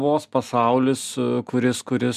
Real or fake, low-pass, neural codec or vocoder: real; 14.4 kHz; none